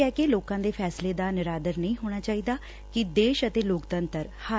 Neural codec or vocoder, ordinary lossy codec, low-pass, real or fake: none; none; none; real